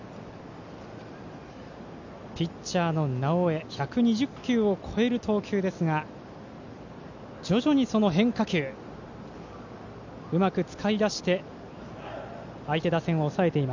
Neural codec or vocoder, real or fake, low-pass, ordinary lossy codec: none; real; 7.2 kHz; none